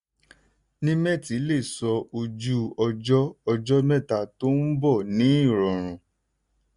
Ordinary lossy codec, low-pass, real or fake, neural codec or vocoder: none; 10.8 kHz; real; none